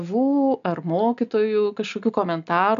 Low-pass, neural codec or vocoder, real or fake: 7.2 kHz; none; real